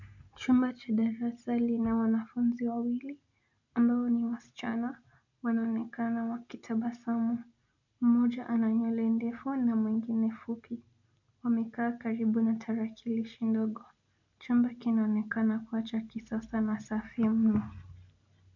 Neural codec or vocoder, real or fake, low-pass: none; real; 7.2 kHz